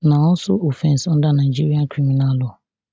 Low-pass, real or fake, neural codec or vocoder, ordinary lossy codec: none; real; none; none